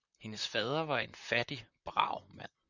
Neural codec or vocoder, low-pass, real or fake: vocoder, 44.1 kHz, 128 mel bands, Pupu-Vocoder; 7.2 kHz; fake